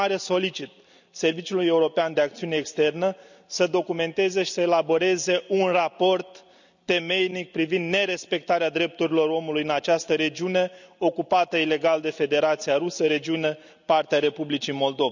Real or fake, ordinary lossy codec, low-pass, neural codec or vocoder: real; none; 7.2 kHz; none